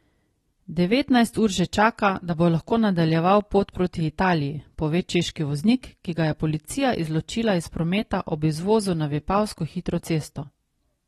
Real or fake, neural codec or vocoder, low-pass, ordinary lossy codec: real; none; 14.4 kHz; AAC, 32 kbps